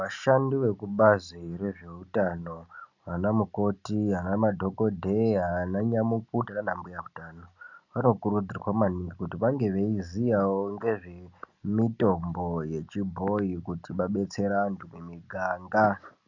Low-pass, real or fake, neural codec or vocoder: 7.2 kHz; real; none